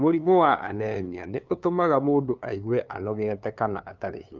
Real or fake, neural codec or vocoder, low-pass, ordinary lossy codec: fake; codec, 16 kHz, 2 kbps, FunCodec, trained on LibriTTS, 25 frames a second; 7.2 kHz; Opus, 24 kbps